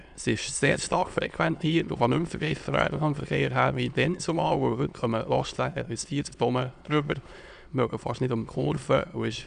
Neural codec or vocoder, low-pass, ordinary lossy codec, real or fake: autoencoder, 22.05 kHz, a latent of 192 numbers a frame, VITS, trained on many speakers; 9.9 kHz; none; fake